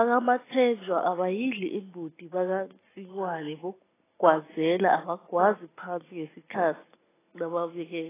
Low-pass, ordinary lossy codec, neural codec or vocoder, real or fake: 3.6 kHz; AAC, 16 kbps; none; real